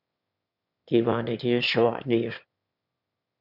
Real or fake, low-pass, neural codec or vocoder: fake; 5.4 kHz; autoencoder, 22.05 kHz, a latent of 192 numbers a frame, VITS, trained on one speaker